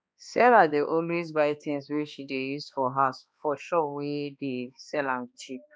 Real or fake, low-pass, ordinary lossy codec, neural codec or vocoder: fake; none; none; codec, 16 kHz, 2 kbps, X-Codec, HuBERT features, trained on balanced general audio